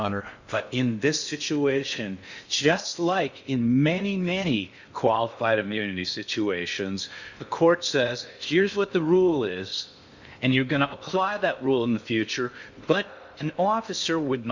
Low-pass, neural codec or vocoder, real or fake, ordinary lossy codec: 7.2 kHz; codec, 16 kHz in and 24 kHz out, 0.6 kbps, FocalCodec, streaming, 2048 codes; fake; Opus, 64 kbps